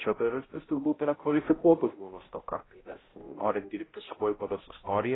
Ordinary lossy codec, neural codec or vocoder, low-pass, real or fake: AAC, 16 kbps; codec, 16 kHz, 0.5 kbps, X-Codec, HuBERT features, trained on balanced general audio; 7.2 kHz; fake